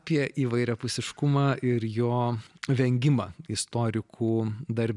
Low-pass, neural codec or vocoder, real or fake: 10.8 kHz; none; real